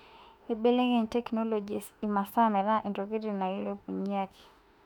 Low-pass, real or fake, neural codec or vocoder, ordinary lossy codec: 19.8 kHz; fake; autoencoder, 48 kHz, 32 numbers a frame, DAC-VAE, trained on Japanese speech; none